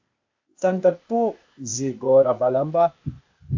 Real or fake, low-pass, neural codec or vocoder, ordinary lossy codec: fake; 7.2 kHz; codec, 16 kHz, 0.8 kbps, ZipCodec; AAC, 48 kbps